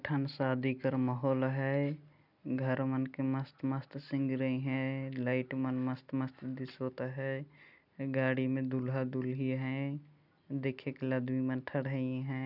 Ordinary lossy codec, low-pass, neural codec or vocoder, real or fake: none; 5.4 kHz; none; real